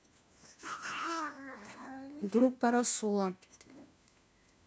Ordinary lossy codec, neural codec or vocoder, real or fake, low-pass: none; codec, 16 kHz, 1 kbps, FunCodec, trained on LibriTTS, 50 frames a second; fake; none